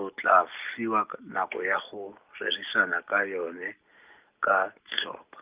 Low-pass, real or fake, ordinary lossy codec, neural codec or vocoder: 3.6 kHz; real; Opus, 24 kbps; none